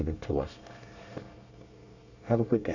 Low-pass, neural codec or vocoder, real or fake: 7.2 kHz; codec, 24 kHz, 1 kbps, SNAC; fake